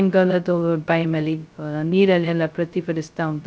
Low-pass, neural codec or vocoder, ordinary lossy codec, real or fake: none; codec, 16 kHz, 0.2 kbps, FocalCodec; none; fake